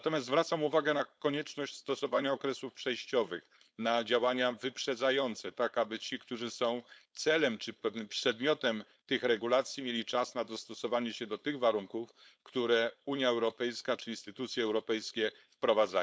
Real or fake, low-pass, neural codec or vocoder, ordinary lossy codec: fake; none; codec, 16 kHz, 4.8 kbps, FACodec; none